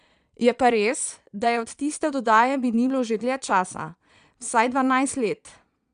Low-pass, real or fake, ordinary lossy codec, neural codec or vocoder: 9.9 kHz; fake; none; vocoder, 22.05 kHz, 80 mel bands, Vocos